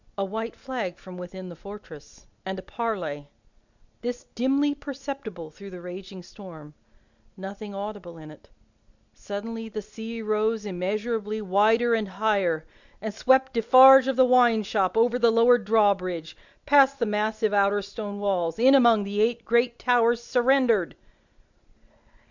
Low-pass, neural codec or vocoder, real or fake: 7.2 kHz; none; real